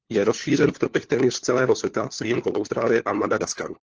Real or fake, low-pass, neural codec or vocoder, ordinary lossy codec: fake; 7.2 kHz; codec, 16 kHz, 4 kbps, FunCodec, trained on LibriTTS, 50 frames a second; Opus, 16 kbps